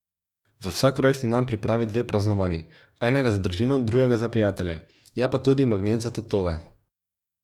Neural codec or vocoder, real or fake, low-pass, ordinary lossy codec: codec, 44.1 kHz, 2.6 kbps, DAC; fake; 19.8 kHz; none